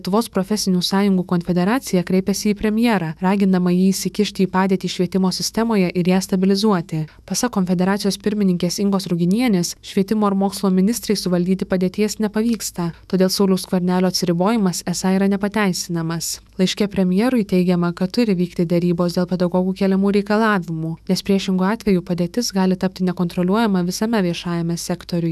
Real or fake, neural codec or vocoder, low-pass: fake; autoencoder, 48 kHz, 128 numbers a frame, DAC-VAE, trained on Japanese speech; 14.4 kHz